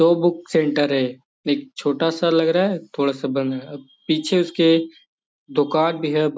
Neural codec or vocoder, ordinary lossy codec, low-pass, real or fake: none; none; none; real